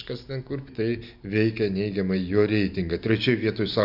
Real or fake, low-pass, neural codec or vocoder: real; 5.4 kHz; none